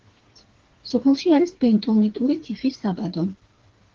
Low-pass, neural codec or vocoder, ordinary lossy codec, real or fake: 7.2 kHz; codec, 16 kHz, 4 kbps, FreqCodec, smaller model; Opus, 16 kbps; fake